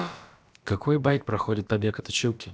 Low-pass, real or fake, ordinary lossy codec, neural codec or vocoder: none; fake; none; codec, 16 kHz, about 1 kbps, DyCAST, with the encoder's durations